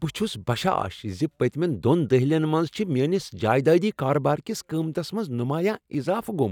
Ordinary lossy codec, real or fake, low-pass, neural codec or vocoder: none; real; 19.8 kHz; none